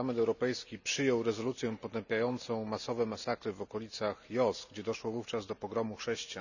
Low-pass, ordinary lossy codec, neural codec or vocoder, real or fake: 7.2 kHz; none; none; real